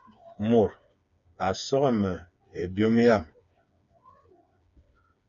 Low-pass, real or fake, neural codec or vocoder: 7.2 kHz; fake; codec, 16 kHz, 4 kbps, FreqCodec, smaller model